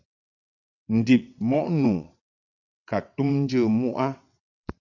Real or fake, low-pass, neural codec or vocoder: fake; 7.2 kHz; vocoder, 22.05 kHz, 80 mel bands, WaveNeXt